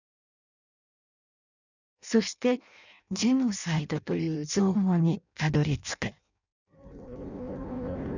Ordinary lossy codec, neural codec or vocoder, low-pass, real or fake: none; codec, 16 kHz in and 24 kHz out, 0.6 kbps, FireRedTTS-2 codec; 7.2 kHz; fake